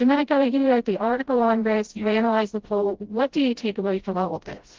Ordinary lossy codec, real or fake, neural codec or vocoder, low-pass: Opus, 16 kbps; fake; codec, 16 kHz, 0.5 kbps, FreqCodec, smaller model; 7.2 kHz